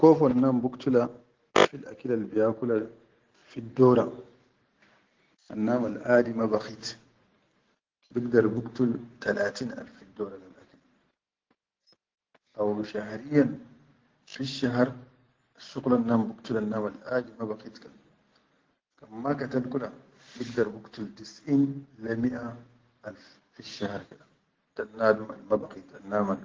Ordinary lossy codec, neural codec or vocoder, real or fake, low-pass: Opus, 16 kbps; none; real; 7.2 kHz